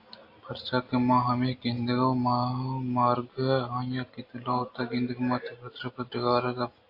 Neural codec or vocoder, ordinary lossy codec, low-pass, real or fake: none; AAC, 32 kbps; 5.4 kHz; real